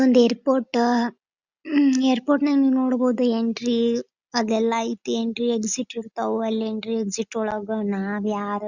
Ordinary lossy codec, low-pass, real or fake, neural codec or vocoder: Opus, 64 kbps; 7.2 kHz; real; none